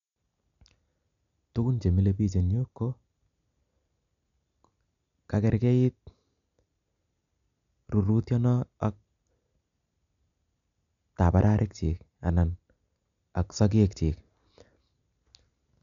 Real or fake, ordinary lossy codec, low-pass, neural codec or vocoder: real; none; 7.2 kHz; none